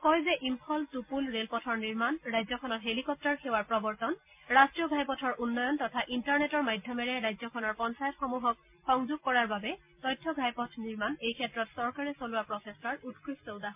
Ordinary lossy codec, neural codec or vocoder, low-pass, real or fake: MP3, 32 kbps; none; 3.6 kHz; real